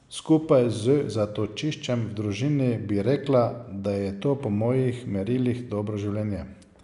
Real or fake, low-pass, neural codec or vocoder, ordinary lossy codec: real; 10.8 kHz; none; none